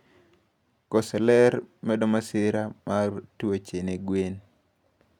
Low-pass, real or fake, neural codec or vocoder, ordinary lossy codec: 19.8 kHz; real; none; none